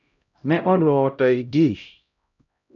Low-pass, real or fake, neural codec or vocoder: 7.2 kHz; fake; codec, 16 kHz, 0.5 kbps, X-Codec, HuBERT features, trained on LibriSpeech